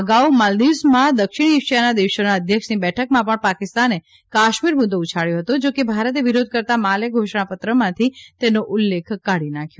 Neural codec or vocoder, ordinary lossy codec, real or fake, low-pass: none; none; real; none